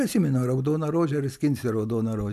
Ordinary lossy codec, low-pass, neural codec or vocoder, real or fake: MP3, 96 kbps; 14.4 kHz; none; real